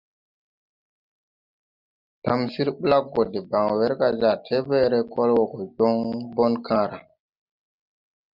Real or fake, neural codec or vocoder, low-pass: real; none; 5.4 kHz